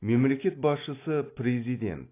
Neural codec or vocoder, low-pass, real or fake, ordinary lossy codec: none; 3.6 kHz; real; AAC, 24 kbps